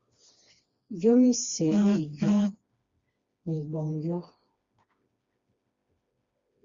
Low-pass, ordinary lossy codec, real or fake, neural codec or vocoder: 7.2 kHz; Opus, 64 kbps; fake; codec, 16 kHz, 2 kbps, FreqCodec, smaller model